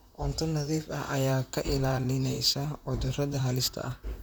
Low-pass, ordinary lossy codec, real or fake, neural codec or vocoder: none; none; fake; vocoder, 44.1 kHz, 128 mel bands, Pupu-Vocoder